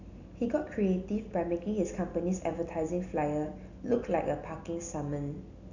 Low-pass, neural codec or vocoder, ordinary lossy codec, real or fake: 7.2 kHz; none; none; real